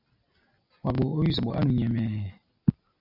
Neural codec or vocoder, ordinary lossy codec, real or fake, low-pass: none; MP3, 48 kbps; real; 5.4 kHz